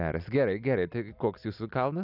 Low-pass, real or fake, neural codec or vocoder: 5.4 kHz; real; none